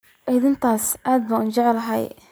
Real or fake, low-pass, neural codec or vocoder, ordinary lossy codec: real; none; none; none